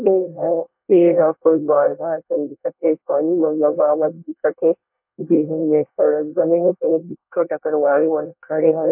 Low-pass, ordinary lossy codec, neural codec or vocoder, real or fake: 3.6 kHz; MP3, 32 kbps; codec, 24 kHz, 1 kbps, SNAC; fake